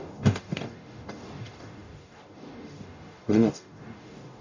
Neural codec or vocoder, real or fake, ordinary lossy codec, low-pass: codec, 44.1 kHz, 0.9 kbps, DAC; fake; none; 7.2 kHz